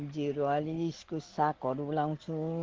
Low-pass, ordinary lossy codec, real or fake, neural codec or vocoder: 7.2 kHz; Opus, 16 kbps; real; none